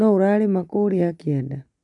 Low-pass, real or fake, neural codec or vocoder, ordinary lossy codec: 10.8 kHz; real; none; none